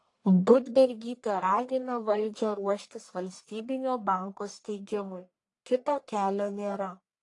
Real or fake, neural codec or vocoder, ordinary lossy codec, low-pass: fake; codec, 44.1 kHz, 1.7 kbps, Pupu-Codec; AAC, 48 kbps; 10.8 kHz